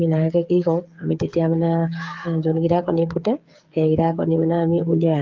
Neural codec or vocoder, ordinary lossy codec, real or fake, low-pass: codec, 16 kHz, 8 kbps, FreqCodec, smaller model; Opus, 24 kbps; fake; 7.2 kHz